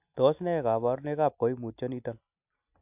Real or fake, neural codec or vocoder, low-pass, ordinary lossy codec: real; none; 3.6 kHz; AAC, 32 kbps